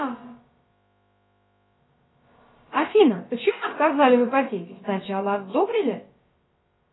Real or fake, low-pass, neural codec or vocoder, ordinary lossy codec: fake; 7.2 kHz; codec, 16 kHz, about 1 kbps, DyCAST, with the encoder's durations; AAC, 16 kbps